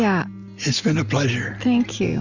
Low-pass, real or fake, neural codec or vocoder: 7.2 kHz; real; none